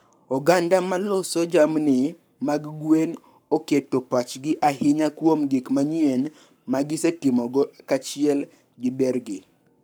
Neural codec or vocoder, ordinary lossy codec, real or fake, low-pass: codec, 44.1 kHz, 7.8 kbps, Pupu-Codec; none; fake; none